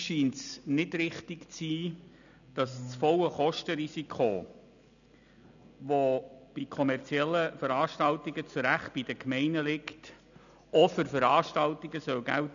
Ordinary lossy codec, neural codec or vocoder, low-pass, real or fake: none; none; 7.2 kHz; real